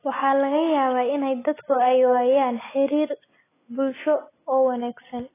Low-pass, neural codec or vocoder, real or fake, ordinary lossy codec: 3.6 kHz; none; real; AAC, 16 kbps